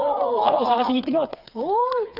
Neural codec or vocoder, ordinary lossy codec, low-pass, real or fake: codec, 16 kHz, 4 kbps, FreqCodec, smaller model; none; 5.4 kHz; fake